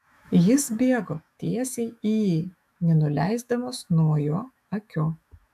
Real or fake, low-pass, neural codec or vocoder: fake; 14.4 kHz; autoencoder, 48 kHz, 128 numbers a frame, DAC-VAE, trained on Japanese speech